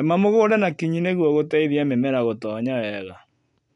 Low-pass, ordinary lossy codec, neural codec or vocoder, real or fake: 10.8 kHz; none; none; real